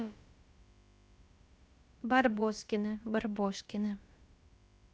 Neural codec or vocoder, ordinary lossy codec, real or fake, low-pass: codec, 16 kHz, about 1 kbps, DyCAST, with the encoder's durations; none; fake; none